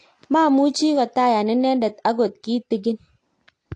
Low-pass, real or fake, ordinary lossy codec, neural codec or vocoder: 9.9 kHz; real; AAC, 48 kbps; none